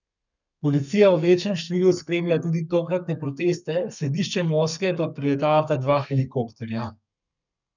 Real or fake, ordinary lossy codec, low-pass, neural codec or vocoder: fake; none; 7.2 kHz; codec, 32 kHz, 1.9 kbps, SNAC